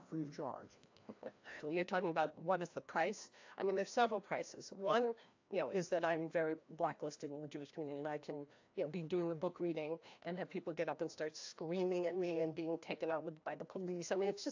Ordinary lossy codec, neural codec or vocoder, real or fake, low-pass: AAC, 48 kbps; codec, 16 kHz, 1 kbps, FreqCodec, larger model; fake; 7.2 kHz